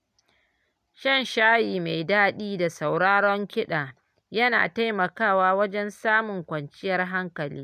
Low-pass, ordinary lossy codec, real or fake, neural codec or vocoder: 14.4 kHz; none; real; none